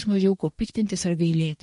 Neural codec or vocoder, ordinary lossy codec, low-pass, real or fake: codec, 24 kHz, 1 kbps, SNAC; MP3, 48 kbps; 10.8 kHz; fake